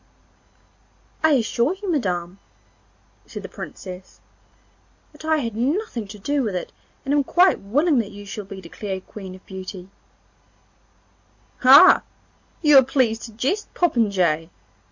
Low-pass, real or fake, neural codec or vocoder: 7.2 kHz; real; none